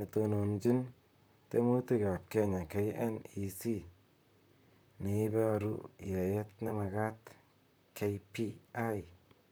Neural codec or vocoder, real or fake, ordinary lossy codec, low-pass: vocoder, 44.1 kHz, 128 mel bands, Pupu-Vocoder; fake; none; none